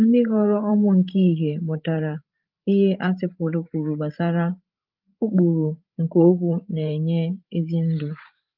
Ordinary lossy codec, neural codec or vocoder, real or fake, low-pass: Opus, 32 kbps; codec, 16 kHz, 16 kbps, FreqCodec, larger model; fake; 5.4 kHz